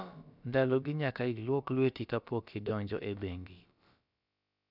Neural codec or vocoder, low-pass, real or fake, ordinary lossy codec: codec, 16 kHz, about 1 kbps, DyCAST, with the encoder's durations; 5.4 kHz; fake; none